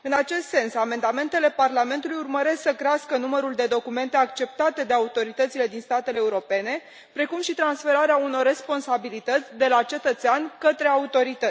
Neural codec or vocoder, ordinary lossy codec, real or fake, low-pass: none; none; real; none